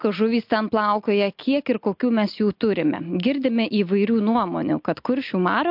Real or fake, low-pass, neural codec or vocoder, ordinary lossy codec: real; 5.4 kHz; none; MP3, 48 kbps